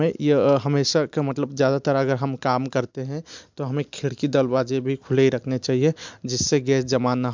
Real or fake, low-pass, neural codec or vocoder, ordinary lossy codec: real; 7.2 kHz; none; MP3, 64 kbps